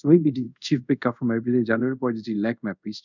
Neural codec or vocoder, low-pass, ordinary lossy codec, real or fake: codec, 24 kHz, 0.5 kbps, DualCodec; 7.2 kHz; none; fake